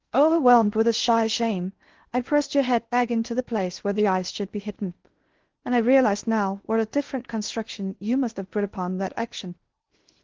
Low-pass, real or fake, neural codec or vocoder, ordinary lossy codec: 7.2 kHz; fake; codec, 16 kHz in and 24 kHz out, 0.6 kbps, FocalCodec, streaming, 4096 codes; Opus, 16 kbps